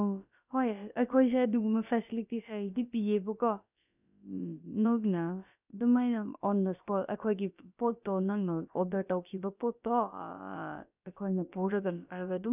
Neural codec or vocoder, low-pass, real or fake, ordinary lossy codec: codec, 16 kHz, about 1 kbps, DyCAST, with the encoder's durations; 3.6 kHz; fake; none